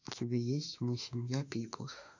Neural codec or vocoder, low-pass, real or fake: autoencoder, 48 kHz, 32 numbers a frame, DAC-VAE, trained on Japanese speech; 7.2 kHz; fake